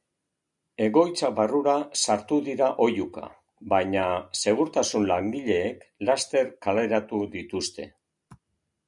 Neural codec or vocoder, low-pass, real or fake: none; 10.8 kHz; real